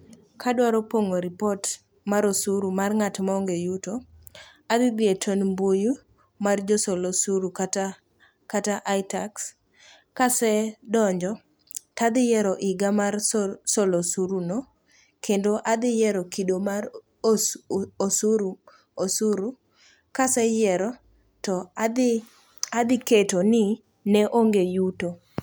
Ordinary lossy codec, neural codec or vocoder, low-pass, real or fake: none; none; none; real